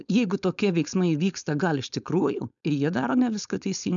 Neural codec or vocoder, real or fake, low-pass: codec, 16 kHz, 4.8 kbps, FACodec; fake; 7.2 kHz